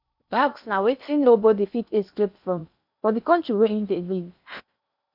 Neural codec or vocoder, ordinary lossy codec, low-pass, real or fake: codec, 16 kHz in and 24 kHz out, 0.6 kbps, FocalCodec, streaming, 2048 codes; none; 5.4 kHz; fake